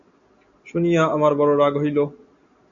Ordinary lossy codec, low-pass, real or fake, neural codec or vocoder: AAC, 64 kbps; 7.2 kHz; real; none